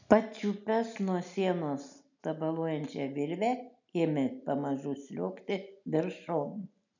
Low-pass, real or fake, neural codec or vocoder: 7.2 kHz; real; none